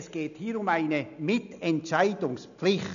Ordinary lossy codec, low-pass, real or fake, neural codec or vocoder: none; 7.2 kHz; real; none